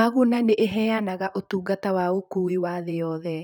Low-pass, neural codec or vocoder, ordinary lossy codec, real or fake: 19.8 kHz; vocoder, 44.1 kHz, 128 mel bands, Pupu-Vocoder; none; fake